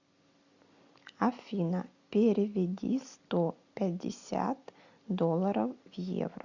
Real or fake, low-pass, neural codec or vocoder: real; 7.2 kHz; none